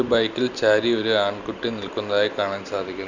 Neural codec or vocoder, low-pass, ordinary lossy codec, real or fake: none; 7.2 kHz; none; real